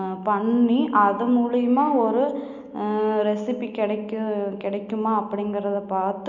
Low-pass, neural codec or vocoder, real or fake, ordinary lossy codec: 7.2 kHz; none; real; none